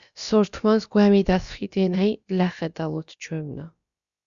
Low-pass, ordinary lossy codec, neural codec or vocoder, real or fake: 7.2 kHz; Opus, 64 kbps; codec, 16 kHz, about 1 kbps, DyCAST, with the encoder's durations; fake